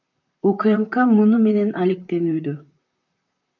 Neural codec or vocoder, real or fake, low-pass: vocoder, 44.1 kHz, 128 mel bands, Pupu-Vocoder; fake; 7.2 kHz